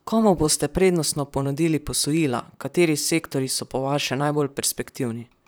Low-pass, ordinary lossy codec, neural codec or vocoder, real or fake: none; none; vocoder, 44.1 kHz, 128 mel bands, Pupu-Vocoder; fake